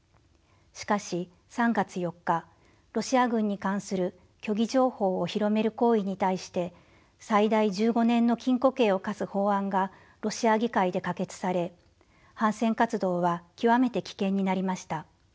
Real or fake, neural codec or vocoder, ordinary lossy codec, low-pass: real; none; none; none